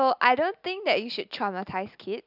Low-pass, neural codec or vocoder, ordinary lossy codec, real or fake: 5.4 kHz; none; none; real